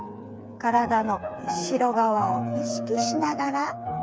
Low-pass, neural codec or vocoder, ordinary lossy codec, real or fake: none; codec, 16 kHz, 4 kbps, FreqCodec, smaller model; none; fake